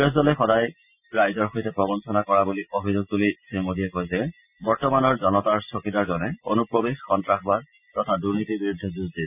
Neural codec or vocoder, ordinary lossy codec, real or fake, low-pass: none; none; real; 3.6 kHz